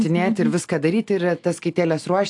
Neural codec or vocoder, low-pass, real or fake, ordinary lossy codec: none; 10.8 kHz; real; AAC, 64 kbps